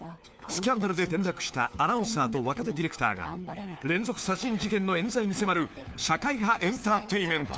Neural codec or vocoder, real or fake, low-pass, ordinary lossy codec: codec, 16 kHz, 4 kbps, FunCodec, trained on LibriTTS, 50 frames a second; fake; none; none